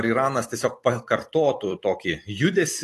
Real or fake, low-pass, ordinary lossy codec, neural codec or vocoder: fake; 14.4 kHz; AAC, 48 kbps; vocoder, 44.1 kHz, 128 mel bands every 256 samples, BigVGAN v2